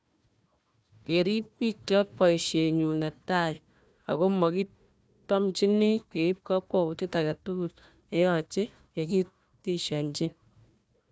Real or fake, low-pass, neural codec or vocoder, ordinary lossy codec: fake; none; codec, 16 kHz, 1 kbps, FunCodec, trained on Chinese and English, 50 frames a second; none